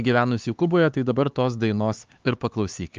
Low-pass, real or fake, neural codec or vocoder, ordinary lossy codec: 7.2 kHz; fake; codec, 16 kHz, 4 kbps, X-Codec, HuBERT features, trained on LibriSpeech; Opus, 32 kbps